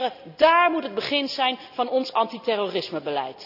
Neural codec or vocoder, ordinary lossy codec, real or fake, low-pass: none; none; real; 5.4 kHz